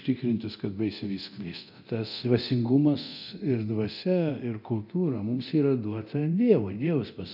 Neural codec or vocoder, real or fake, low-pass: codec, 24 kHz, 0.9 kbps, DualCodec; fake; 5.4 kHz